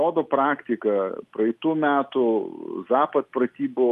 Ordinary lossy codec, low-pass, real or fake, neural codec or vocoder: Opus, 32 kbps; 14.4 kHz; real; none